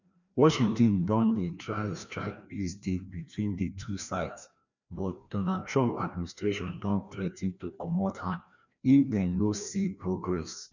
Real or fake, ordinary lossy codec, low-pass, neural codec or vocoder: fake; none; 7.2 kHz; codec, 16 kHz, 1 kbps, FreqCodec, larger model